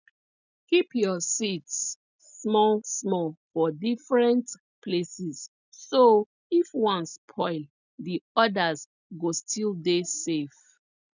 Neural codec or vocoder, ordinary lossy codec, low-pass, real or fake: none; none; 7.2 kHz; real